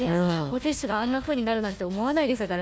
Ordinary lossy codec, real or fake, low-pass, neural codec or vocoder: none; fake; none; codec, 16 kHz, 1 kbps, FunCodec, trained on Chinese and English, 50 frames a second